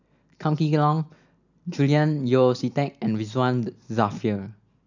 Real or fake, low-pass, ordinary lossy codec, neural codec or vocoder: real; 7.2 kHz; none; none